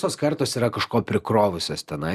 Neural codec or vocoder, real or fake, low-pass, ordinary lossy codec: none; real; 14.4 kHz; Opus, 64 kbps